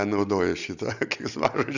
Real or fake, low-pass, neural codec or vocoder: real; 7.2 kHz; none